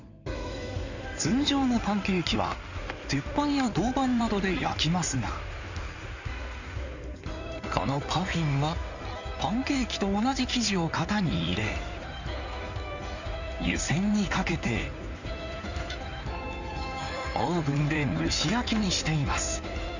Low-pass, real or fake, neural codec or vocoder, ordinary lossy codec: 7.2 kHz; fake; codec, 16 kHz in and 24 kHz out, 2.2 kbps, FireRedTTS-2 codec; none